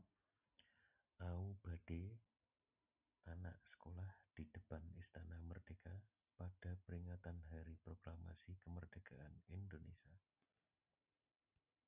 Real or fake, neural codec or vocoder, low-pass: real; none; 3.6 kHz